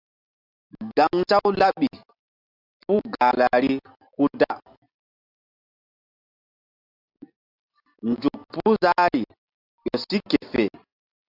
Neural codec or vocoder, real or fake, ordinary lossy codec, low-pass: none; real; AAC, 48 kbps; 5.4 kHz